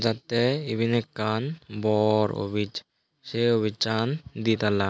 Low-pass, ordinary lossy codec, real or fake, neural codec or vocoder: none; none; real; none